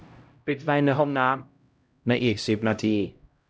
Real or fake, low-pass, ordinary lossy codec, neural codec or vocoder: fake; none; none; codec, 16 kHz, 0.5 kbps, X-Codec, HuBERT features, trained on LibriSpeech